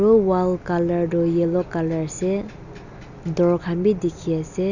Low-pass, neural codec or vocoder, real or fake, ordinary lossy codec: 7.2 kHz; none; real; none